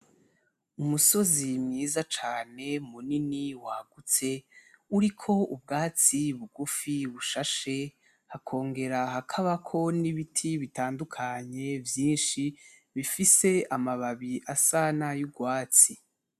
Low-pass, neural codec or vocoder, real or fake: 14.4 kHz; none; real